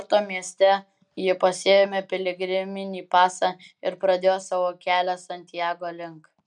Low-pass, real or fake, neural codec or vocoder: 10.8 kHz; real; none